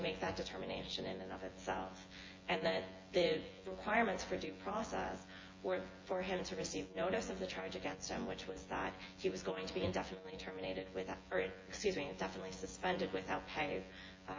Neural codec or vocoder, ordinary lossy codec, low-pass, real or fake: vocoder, 24 kHz, 100 mel bands, Vocos; MP3, 32 kbps; 7.2 kHz; fake